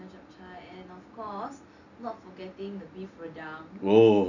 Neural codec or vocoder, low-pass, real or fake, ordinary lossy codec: none; 7.2 kHz; real; none